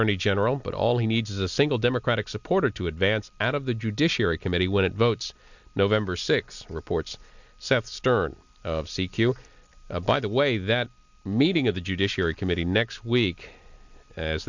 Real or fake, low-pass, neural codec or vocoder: real; 7.2 kHz; none